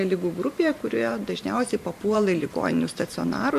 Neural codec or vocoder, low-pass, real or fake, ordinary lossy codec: vocoder, 44.1 kHz, 128 mel bands every 512 samples, BigVGAN v2; 14.4 kHz; fake; MP3, 64 kbps